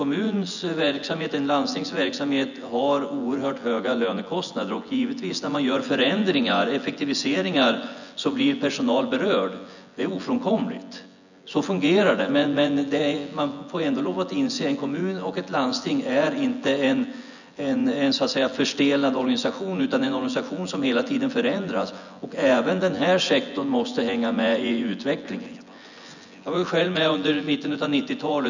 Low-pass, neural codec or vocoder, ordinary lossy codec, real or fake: 7.2 kHz; vocoder, 24 kHz, 100 mel bands, Vocos; none; fake